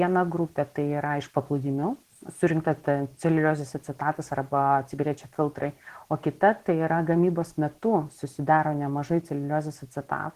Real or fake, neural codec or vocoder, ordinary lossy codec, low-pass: real; none; Opus, 16 kbps; 14.4 kHz